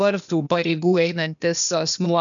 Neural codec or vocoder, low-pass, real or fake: codec, 16 kHz, 0.8 kbps, ZipCodec; 7.2 kHz; fake